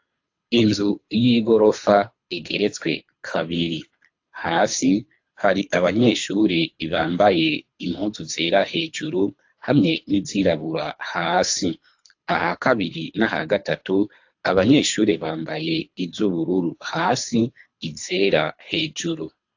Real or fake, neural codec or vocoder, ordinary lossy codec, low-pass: fake; codec, 24 kHz, 3 kbps, HILCodec; AAC, 48 kbps; 7.2 kHz